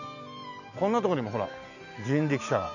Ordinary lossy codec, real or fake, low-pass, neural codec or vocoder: none; real; 7.2 kHz; none